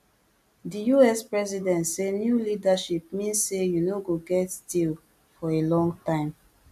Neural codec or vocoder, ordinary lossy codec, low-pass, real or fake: none; AAC, 96 kbps; 14.4 kHz; real